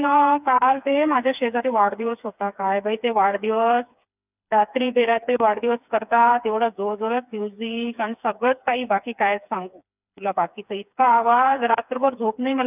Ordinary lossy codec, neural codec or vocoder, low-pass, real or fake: none; codec, 16 kHz, 4 kbps, FreqCodec, smaller model; 3.6 kHz; fake